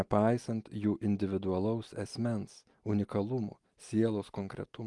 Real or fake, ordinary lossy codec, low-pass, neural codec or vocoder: real; Opus, 24 kbps; 10.8 kHz; none